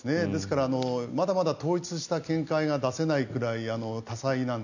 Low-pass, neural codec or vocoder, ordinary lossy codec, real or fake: 7.2 kHz; none; none; real